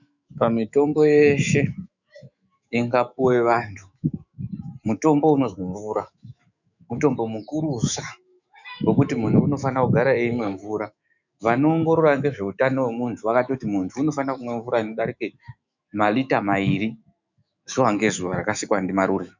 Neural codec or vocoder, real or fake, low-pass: autoencoder, 48 kHz, 128 numbers a frame, DAC-VAE, trained on Japanese speech; fake; 7.2 kHz